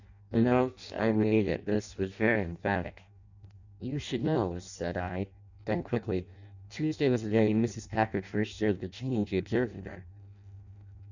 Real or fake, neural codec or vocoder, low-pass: fake; codec, 16 kHz in and 24 kHz out, 0.6 kbps, FireRedTTS-2 codec; 7.2 kHz